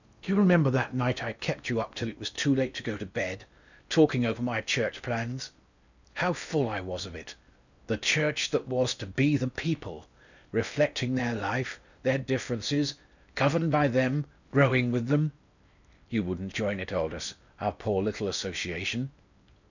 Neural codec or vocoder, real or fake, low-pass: codec, 16 kHz in and 24 kHz out, 0.8 kbps, FocalCodec, streaming, 65536 codes; fake; 7.2 kHz